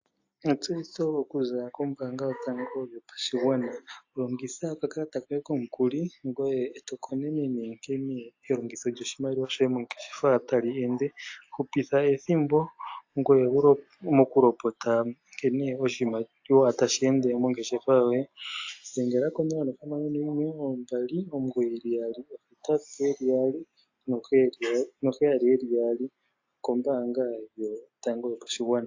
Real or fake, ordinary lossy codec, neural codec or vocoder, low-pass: real; AAC, 48 kbps; none; 7.2 kHz